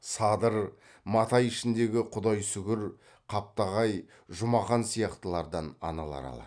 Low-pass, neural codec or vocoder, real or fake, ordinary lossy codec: 9.9 kHz; none; real; none